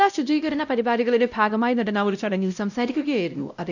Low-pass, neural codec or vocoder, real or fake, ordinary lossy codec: 7.2 kHz; codec, 16 kHz, 0.5 kbps, X-Codec, WavLM features, trained on Multilingual LibriSpeech; fake; none